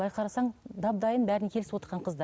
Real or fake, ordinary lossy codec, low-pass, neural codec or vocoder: real; none; none; none